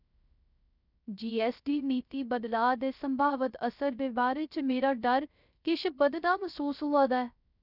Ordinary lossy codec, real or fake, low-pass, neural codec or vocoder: none; fake; 5.4 kHz; codec, 16 kHz, 0.3 kbps, FocalCodec